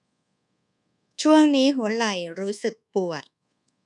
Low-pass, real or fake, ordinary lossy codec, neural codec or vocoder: 10.8 kHz; fake; none; codec, 24 kHz, 1.2 kbps, DualCodec